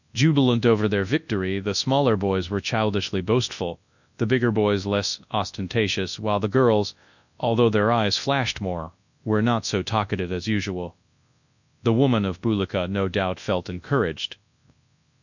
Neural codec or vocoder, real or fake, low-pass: codec, 24 kHz, 0.9 kbps, WavTokenizer, large speech release; fake; 7.2 kHz